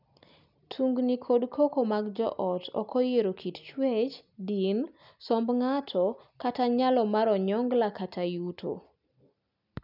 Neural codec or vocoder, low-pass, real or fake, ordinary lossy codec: none; 5.4 kHz; real; none